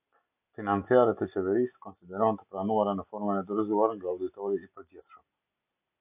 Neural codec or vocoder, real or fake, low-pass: none; real; 3.6 kHz